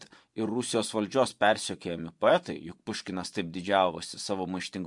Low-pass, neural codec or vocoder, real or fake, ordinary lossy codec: 10.8 kHz; vocoder, 24 kHz, 100 mel bands, Vocos; fake; MP3, 64 kbps